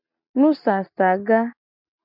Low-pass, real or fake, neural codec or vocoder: 5.4 kHz; real; none